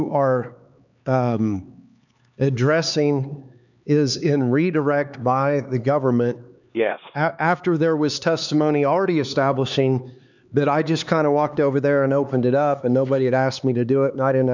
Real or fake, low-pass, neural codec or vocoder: fake; 7.2 kHz; codec, 16 kHz, 4 kbps, X-Codec, HuBERT features, trained on LibriSpeech